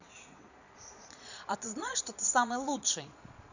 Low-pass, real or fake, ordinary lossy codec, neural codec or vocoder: 7.2 kHz; fake; none; vocoder, 22.05 kHz, 80 mel bands, Vocos